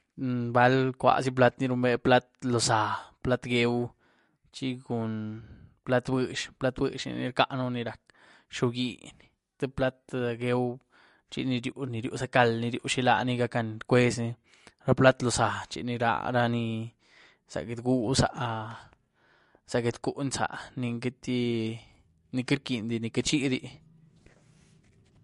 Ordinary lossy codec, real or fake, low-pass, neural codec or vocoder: MP3, 48 kbps; real; 14.4 kHz; none